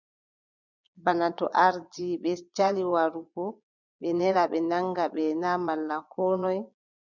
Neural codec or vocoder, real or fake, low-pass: vocoder, 22.05 kHz, 80 mel bands, Vocos; fake; 7.2 kHz